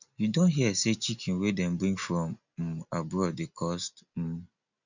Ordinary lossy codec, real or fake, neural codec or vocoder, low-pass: none; real; none; 7.2 kHz